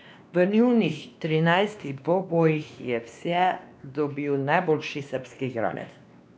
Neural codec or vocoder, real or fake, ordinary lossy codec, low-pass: codec, 16 kHz, 2 kbps, X-Codec, WavLM features, trained on Multilingual LibriSpeech; fake; none; none